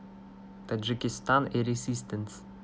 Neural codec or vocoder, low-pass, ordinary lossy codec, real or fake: none; none; none; real